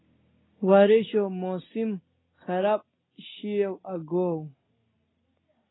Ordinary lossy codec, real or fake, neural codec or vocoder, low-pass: AAC, 16 kbps; real; none; 7.2 kHz